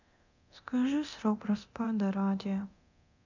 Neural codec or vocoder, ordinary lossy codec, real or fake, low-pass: codec, 24 kHz, 0.9 kbps, DualCodec; none; fake; 7.2 kHz